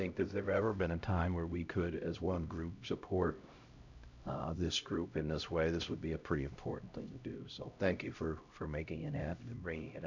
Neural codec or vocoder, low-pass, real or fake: codec, 16 kHz, 0.5 kbps, X-Codec, HuBERT features, trained on LibriSpeech; 7.2 kHz; fake